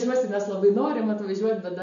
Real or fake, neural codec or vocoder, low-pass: real; none; 7.2 kHz